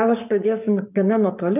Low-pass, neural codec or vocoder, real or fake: 3.6 kHz; codec, 44.1 kHz, 7.8 kbps, Pupu-Codec; fake